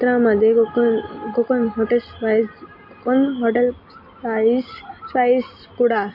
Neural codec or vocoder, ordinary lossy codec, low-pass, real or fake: none; MP3, 32 kbps; 5.4 kHz; real